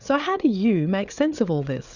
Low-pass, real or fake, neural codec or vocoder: 7.2 kHz; fake; codec, 16 kHz, 8 kbps, FreqCodec, larger model